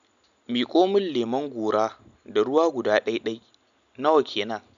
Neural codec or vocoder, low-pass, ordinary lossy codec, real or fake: none; 7.2 kHz; none; real